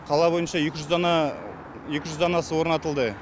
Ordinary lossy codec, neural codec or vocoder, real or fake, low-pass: none; none; real; none